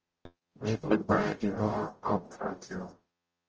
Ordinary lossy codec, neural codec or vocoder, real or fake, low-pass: Opus, 24 kbps; codec, 44.1 kHz, 0.9 kbps, DAC; fake; 7.2 kHz